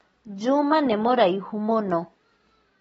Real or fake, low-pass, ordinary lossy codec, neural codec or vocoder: real; 19.8 kHz; AAC, 24 kbps; none